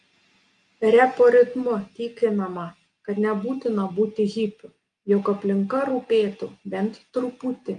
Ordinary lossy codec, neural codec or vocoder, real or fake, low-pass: Opus, 32 kbps; none; real; 9.9 kHz